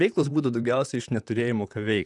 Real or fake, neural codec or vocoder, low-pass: fake; vocoder, 44.1 kHz, 128 mel bands, Pupu-Vocoder; 10.8 kHz